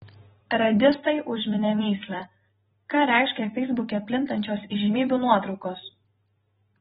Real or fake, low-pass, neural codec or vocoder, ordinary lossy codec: fake; 19.8 kHz; vocoder, 44.1 kHz, 128 mel bands every 256 samples, BigVGAN v2; AAC, 16 kbps